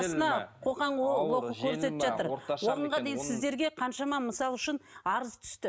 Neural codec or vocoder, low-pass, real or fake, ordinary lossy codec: none; none; real; none